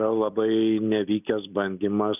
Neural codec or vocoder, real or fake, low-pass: none; real; 3.6 kHz